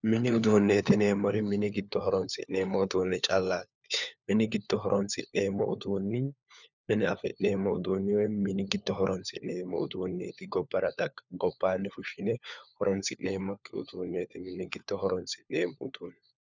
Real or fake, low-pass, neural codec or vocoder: fake; 7.2 kHz; codec, 16 kHz, 4 kbps, FunCodec, trained on LibriTTS, 50 frames a second